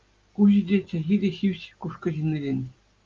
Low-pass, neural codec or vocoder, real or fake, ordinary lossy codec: 7.2 kHz; none; real; Opus, 24 kbps